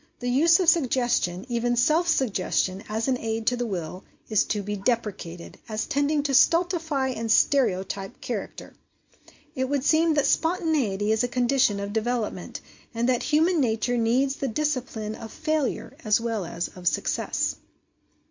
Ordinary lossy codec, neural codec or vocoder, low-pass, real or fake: MP3, 48 kbps; none; 7.2 kHz; real